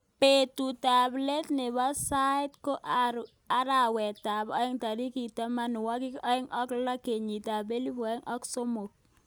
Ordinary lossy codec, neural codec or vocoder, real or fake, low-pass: none; none; real; none